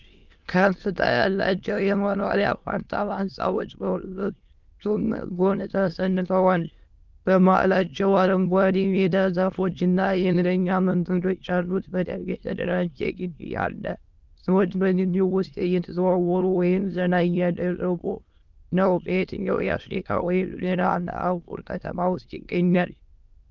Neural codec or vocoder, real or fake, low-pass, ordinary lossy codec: autoencoder, 22.05 kHz, a latent of 192 numbers a frame, VITS, trained on many speakers; fake; 7.2 kHz; Opus, 24 kbps